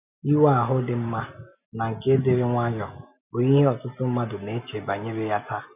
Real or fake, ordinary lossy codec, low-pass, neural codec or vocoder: real; none; 3.6 kHz; none